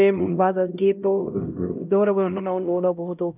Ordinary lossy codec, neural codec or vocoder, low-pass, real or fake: none; codec, 16 kHz, 0.5 kbps, X-Codec, HuBERT features, trained on LibriSpeech; 3.6 kHz; fake